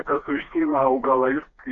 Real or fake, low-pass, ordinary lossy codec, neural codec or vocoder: fake; 7.2 kHz; Opus, 64 kbps; codec, 16 kHz, 2 kbps, FreqCodec, smaller model